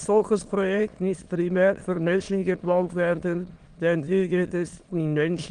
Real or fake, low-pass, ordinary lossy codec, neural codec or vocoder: fake; 9.9 kHz; Opus, 24 kbps; autoencoder, 22.05 kHz, a latent of 192 numbers a frame, VITS, trained on many speakers